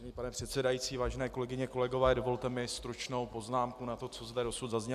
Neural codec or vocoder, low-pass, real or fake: none; 14.4 kHz; real